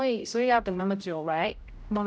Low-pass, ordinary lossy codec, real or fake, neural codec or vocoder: none; none; fake; codec, 16 kHz, 0.5 kbps, X-Codec, HuBERT features, trained on general audio